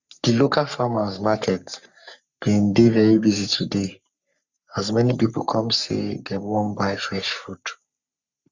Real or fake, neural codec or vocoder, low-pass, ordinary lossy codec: fake; codec, 44.1 kHz, 3.4 kbps, Pupu-Codec; 7.2 kHz; Opus, 64 kbps